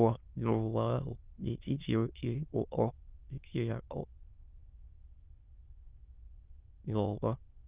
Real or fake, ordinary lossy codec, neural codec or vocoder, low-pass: fake; Opus, 32 kbps; autoencoder, 22.05 kHz, a latent of 192 numbers a frame, VITS, trained on many speakers; 3.6 kHz